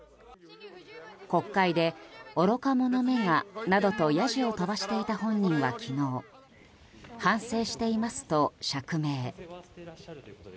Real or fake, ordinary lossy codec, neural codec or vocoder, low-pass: real; none; none; none